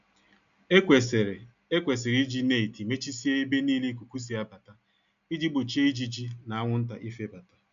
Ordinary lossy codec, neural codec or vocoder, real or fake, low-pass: none; none; real; 7.2 kHz